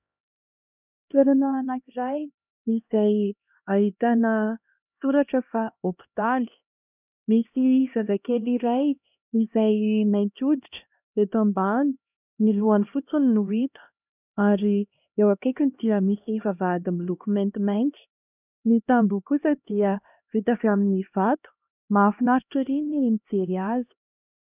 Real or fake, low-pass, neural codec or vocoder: fake; 3.6 kHz; codec, 16 kHz, 1 kbps, X-Codec, HuBERT features, trained on LibriSpeech